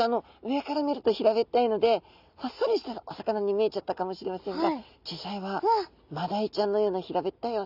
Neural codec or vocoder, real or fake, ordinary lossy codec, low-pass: none; real; none; 5.4 kHz